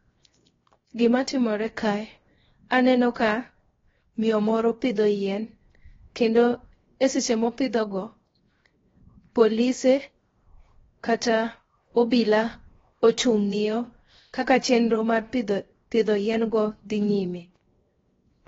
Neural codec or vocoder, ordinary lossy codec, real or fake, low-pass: codec, 16 kHz, 0.7 kbps, FocalCodec; AAC, 24 kbps; fake; 7.2 kHz